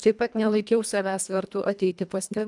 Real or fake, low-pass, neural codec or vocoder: fake; 10.8 kHz; codec, 24 kHz, 1.5 kbps, HILCodec